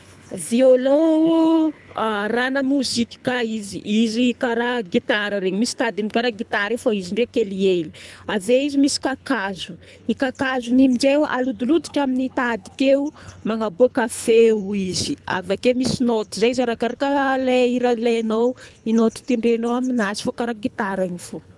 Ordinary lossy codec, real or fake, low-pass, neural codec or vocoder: none; fake; none; codec, 24 kHz, 3 kbps, HILCodec